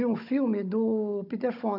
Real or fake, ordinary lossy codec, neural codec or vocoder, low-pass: fake; none; codec, 16 kHz, 16 kbps, FunCodec, trained on Chinese and English, 50 frames a second; 5.4 kHz